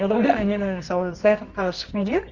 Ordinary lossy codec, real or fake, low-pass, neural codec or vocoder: Opus, 64 kbps; fake; 7.2 kHz; codec, 24 kHz, 0.9 kbps, WavTokenizer, medium music audio release